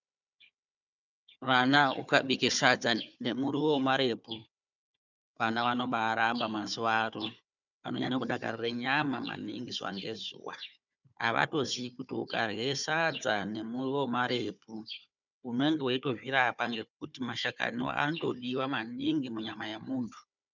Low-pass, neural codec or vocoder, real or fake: 7.2 kHz; codec, 16 kHz, 4 kbps, FunCodec, trained on Chinese and English, 50 frames a second; fake